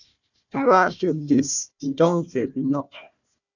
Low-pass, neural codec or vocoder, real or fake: 7.2 kHz; codec, 16 kHz, 1 kbps, FunCodec, trained on Chinese and English, 50 frames a second; fake